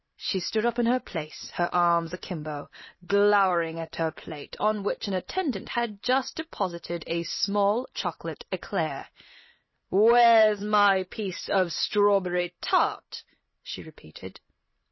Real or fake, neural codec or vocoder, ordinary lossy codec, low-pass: fake; vocoder, 44.1 kHz, 128 mel bands every 256 samples, BigVGAN v2; MP3, 24 kbps; 7.2 kHz